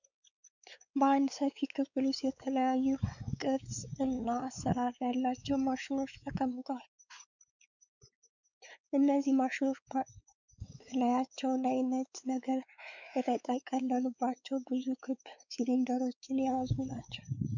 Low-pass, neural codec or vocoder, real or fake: 7.2 kHz; codec, 16 kHz, 4 kbps, X-Codec, WavLM features, trained on Multilingual LibriSpeech; fake